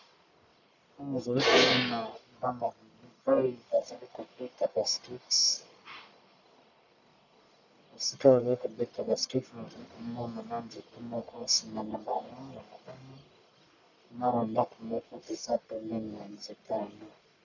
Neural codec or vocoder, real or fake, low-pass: codec, 44.1 kHz, 1.7 kbps, Pupu-Codec; fake; 7.2 kHz